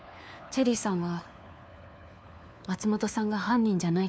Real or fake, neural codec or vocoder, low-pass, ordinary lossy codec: fake; codec, 16 kHz, 4 kbps, FunCodec, trained on LibriTTS, 50 frames a second; none; none